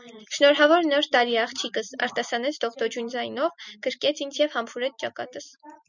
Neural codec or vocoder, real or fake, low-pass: none; real; 7.2 kHz